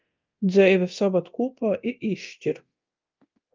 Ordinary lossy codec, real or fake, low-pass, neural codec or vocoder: Opus, 24 kbps; fake; 7.2 kHz; codec, 24 kHz, 0.9 kbps, DualCodec